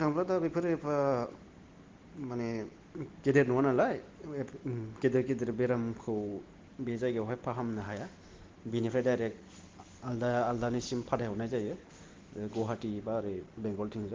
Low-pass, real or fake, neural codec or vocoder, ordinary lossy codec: 7.2 kHz; real; none; Opus, 16 kbps